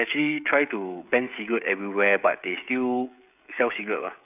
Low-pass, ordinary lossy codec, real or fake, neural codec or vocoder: 3.6 kHz; none; fake; codec, 16 kHz, 16 kbps, FreqCodec, smaller model